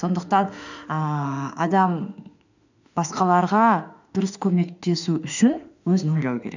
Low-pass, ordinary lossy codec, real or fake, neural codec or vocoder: 7.2 kHz; none; fake; autoencoder, 48 kHz, 32 numbers a frame, DAC-VAE, trained on Japanese speech